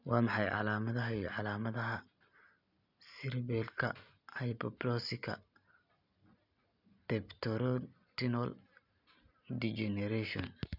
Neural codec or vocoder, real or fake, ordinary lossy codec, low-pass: none; real; none; 5.4 kHz